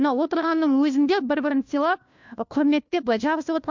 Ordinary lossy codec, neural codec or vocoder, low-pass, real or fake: MP3, 64 kbps; codec, 16 kHz, 1 kbps, FunCodec, trained on LibriTTS, 50 frames a second; 7.2 kHz; fake